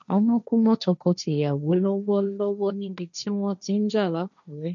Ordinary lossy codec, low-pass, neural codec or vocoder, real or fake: none; 7.2 kHz; codec, 16 kHz, 1.1 kbps, Voila-Tokenizer; fake